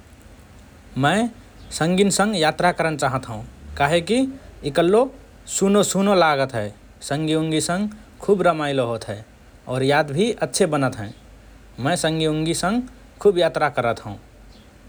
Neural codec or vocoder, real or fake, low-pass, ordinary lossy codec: none; real; none; none